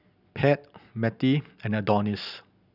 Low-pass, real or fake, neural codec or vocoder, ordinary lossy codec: 5.4 kHz; real; none; none